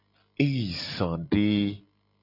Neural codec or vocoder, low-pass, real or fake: none; 5.4 kHz; real